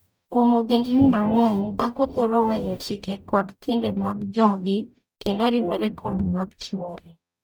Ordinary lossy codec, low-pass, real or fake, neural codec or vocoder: none; none; fake; codec, 44.1 kHz, 0.9 kbps, DAC